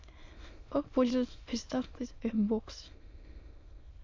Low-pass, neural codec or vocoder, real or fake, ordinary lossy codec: 7.2 kHz; autoencoder, 22.05 kHz, a latent of 192 numbers a frame, VITS, trained on many speakers; fake; AAC, 48 kbps